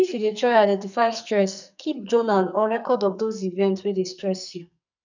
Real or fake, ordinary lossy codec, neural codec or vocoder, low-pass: fake; none; codec, 44.1 kHz, 2.6 kbps, SNAC; 7.2 kHz